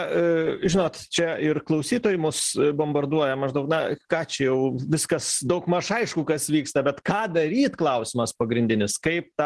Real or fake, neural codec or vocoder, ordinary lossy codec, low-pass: real; none; Opus, 16 kbps; 10.8 kHz